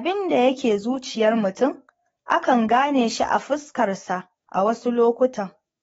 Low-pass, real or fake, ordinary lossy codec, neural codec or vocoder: 19.8 kHz; fake; AAC, 24 kbps; codec, 44.1 kHz, 7.8 kbps, DAC